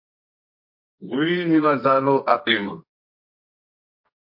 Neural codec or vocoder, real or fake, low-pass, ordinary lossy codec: codec, 24 kHz, 0.9 kbps, WavTokenizer, medium music audio release; fake; 5.4 kHz; MP3, 32 kbps